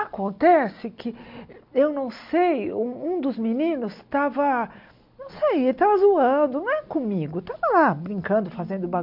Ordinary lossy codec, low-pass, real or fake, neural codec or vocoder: MP3, 48 kbps; 5.4 kHz; real; none